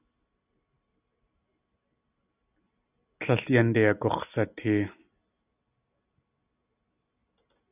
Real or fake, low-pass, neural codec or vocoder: real; 3.6 kHz; none